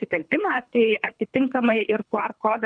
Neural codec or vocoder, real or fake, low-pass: codec, 24 kHz, 3 kbps, HILCodec; fake; 9.9 kHz